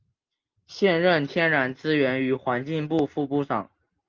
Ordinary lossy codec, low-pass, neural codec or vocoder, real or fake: Opus, 24 kbps; 7.2 kHz; none; real